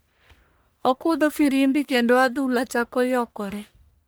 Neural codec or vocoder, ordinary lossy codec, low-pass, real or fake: codec, 44.1 kHz, 1.7 kbps, Pupu-Codec; none; none; fake